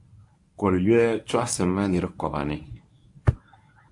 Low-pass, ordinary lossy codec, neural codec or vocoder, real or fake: 10.8 kHz; AAC, 64 kbps; codec, 24 kHz, 0.9 kbps, WavTokenizer, medium speech release version 1; fake